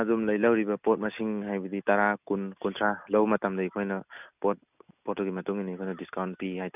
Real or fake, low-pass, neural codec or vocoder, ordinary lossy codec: real; 3.6 kHz; none; none